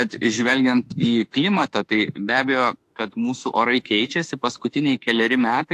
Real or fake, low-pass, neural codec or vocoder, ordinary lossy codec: fake; 14.4 kHz; autoencoder, 48 kHz, 32 numbers a frame, DAC-VAE, trained on Japanese speech; AAC, 64 kbps